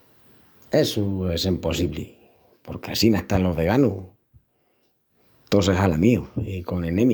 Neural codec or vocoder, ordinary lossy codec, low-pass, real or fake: codec, 44.1 kHz, 7.8 kbps, DAC; none; none; fake